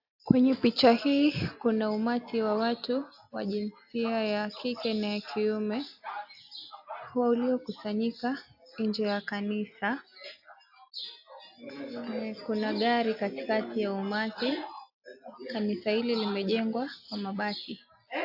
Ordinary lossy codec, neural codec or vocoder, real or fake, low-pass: AAC, 48 kbps; none; real; 5.4 kHz